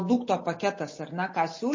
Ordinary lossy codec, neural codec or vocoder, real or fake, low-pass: MP3, 32 kbps; none; real; 7.2 kHz